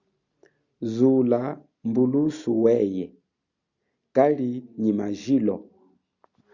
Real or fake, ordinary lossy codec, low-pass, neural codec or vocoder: real; Opus, 64 kbps; 7.2 kHz; none